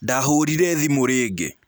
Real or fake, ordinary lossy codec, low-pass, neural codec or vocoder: real; none; none; none